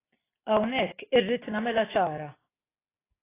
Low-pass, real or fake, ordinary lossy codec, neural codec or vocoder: 3.6 kHz; real; AAC, 16 kbps; none